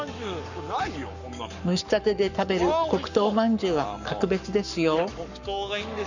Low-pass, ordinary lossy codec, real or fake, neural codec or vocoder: 7.2 kHz; none; fake; codec, 44.1 kHz, 7.8 kbps, Pupu-Codec